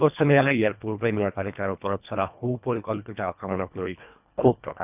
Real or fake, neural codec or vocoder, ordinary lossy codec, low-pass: fake; codec, 24 kHz, 1.5 kbps, HILCodec; none; 3.6 kHz